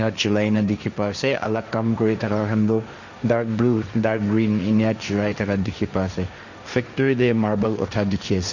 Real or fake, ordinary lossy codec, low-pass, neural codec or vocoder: fake; none; 7.2 kHz; codec, 16 kHz, 1.1 kbps, Voila-Tokenizer